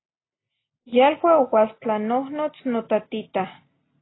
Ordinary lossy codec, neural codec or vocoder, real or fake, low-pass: AAC, 16 kbps; none; real; 7.2 kHz